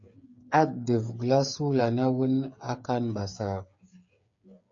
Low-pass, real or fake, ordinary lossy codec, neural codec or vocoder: 7.2 kHz; fake; MP3, 48 kbps; codec, 16 kHz, 8 kbps, FreqCodec, smaller model